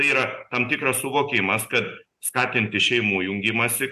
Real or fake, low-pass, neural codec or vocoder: real; 14.4 kHz; none